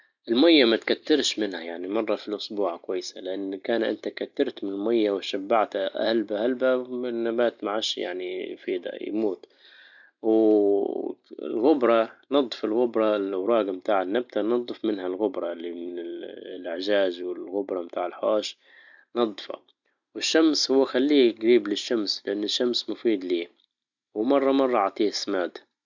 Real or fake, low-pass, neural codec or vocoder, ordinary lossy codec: real; 7.2 kHz; none; none